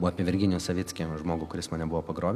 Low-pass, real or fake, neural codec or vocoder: 14.4 kHz; real; none